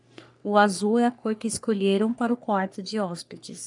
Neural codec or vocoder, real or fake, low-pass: codec, 44.1 kHz, 3.4 kbps, Pupu-Codec; fake; 10.8 kHz